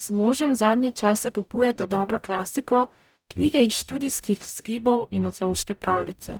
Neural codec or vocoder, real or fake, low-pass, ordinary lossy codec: codec, 44.1 kHz, 0.9 kbps, DAC; fake; none; none